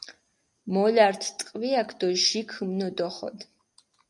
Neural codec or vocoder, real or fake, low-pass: none; real; 10.8 kHz